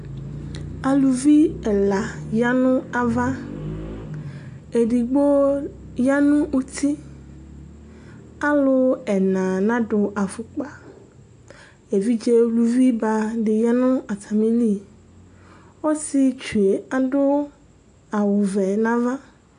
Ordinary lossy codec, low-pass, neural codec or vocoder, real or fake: AAC, 64 kbps; 9.9 kHz; none; real